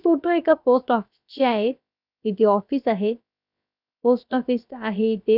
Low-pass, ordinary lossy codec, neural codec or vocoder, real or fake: 5.4 kHz; none; codec, 16 kHz, about 1 kbps, DyCAST, with the encoder's durations; fake